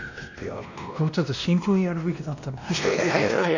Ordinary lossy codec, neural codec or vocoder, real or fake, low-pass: none; codec, 16 kHz, 1 kbps, X-Codec, WavLM features, trained on Multilingual LibriSpeech; fake; 7.2 kHz